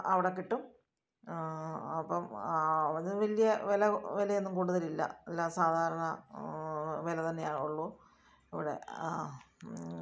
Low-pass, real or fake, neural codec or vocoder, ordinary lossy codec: none; real; none; none